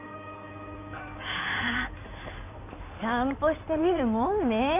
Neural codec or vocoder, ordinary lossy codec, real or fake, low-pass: codec, 16 kHz in and 24 kHz out, 2.2 kbps, FireRedTTS-2 codec; none; fake; 3.6 kHz